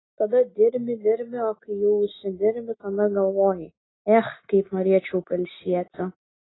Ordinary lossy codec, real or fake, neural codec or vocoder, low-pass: AAC, 16 kbps; real; none; 7.2 kHz